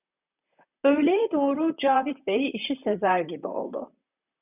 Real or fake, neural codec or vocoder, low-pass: fake; vocoder, 44.1 kHz, 128 mel bands, Pupu-Vocoder; 3.6 kHz